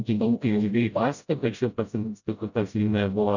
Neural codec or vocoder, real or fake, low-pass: codec, 16 kHz, 0.5 kbps, FreqCodec, smaller model; fake; 7.2 kHz